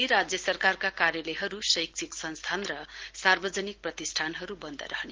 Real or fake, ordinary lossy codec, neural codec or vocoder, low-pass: real; Opus, 16 kbps; none; 7.2 kHz